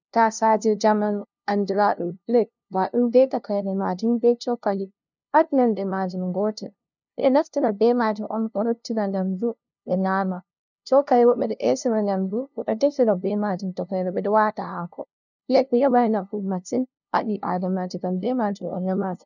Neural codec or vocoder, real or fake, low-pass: codec, 16 kHz, 0.5 kbps, FunCodec, trained on LibriTTS, 25 frames a second; fake; 7.2 kHz